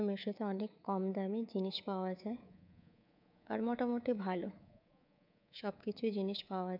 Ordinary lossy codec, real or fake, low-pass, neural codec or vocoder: none; fake; 5.4 kHz; codec, 24 kHz, 3.1 kbps, DualCodec